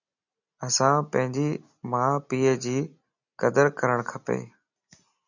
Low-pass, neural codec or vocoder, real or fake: 7.2 kHz; none; real